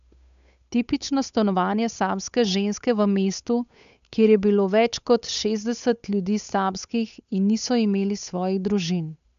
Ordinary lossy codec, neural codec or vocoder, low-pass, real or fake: none; codec, 16 kHz, 8 kbps, FunCodec, trained on Chinese and English, 25 frames a second; 7.2 kHz; fake